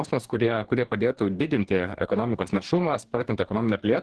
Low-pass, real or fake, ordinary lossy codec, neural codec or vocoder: 10.8 kHz; fake; Opus, 16 kbps; codec, 44.1 kHz, 2.6 kbps, DAC